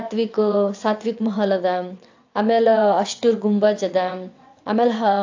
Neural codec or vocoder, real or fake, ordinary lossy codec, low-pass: vocoder, 22.05 kHz, 80 mel bands, WaveNeXt; fake; AAC, 48 kbps; 7.2 kHz